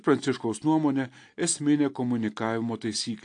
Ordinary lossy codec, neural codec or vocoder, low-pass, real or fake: AAC, 48 kbps; none; 9.9 kHz; real